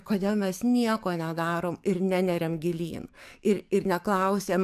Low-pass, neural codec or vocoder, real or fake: 14.4 kHz; codec, 44.1 kHz, 7.8 kbps, DAC; fake